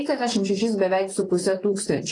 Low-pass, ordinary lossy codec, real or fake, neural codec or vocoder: 10.8 kHz; AAC, 32 kbps; fake; vocoder, 44.1 kHz, 128 mel bands, Pupu-Vocoder